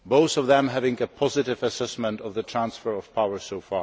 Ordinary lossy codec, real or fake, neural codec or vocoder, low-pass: none; real; none; none